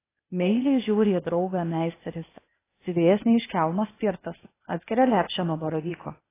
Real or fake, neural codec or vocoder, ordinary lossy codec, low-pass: fake; codec, 16 kHz, 0.8 kbps, ZipCodec; AAC, 16 kbps; 3.6 kHz